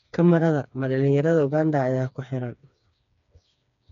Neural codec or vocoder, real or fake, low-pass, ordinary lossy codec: codec, 16 kHz, 4 kbps, FreqCodec, smaller model; fake; 7.2 kHz; none